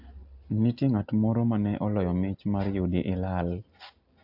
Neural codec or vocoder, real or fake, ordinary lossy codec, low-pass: none; real; AAC, 48 kbps; 5.4 kHz